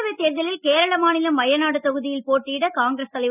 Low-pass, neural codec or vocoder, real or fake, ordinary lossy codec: 3.6 kHz; none; real; none